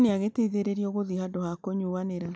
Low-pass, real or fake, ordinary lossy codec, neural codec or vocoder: none; real; none; none